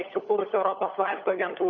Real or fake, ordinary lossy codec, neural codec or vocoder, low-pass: fake; MP3, 32 kbps; codec, 16 kHz, 16 kbps, FunCodec, trained on LibriTTS, 50 frames a second; 7.2 kHz